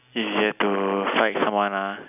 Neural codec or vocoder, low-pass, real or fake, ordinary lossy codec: none; 3.6 kHz; real; none